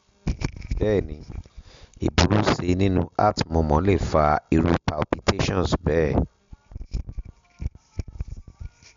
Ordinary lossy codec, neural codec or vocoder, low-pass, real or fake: MP3, 64 kbps; none; 7.2 kHz; real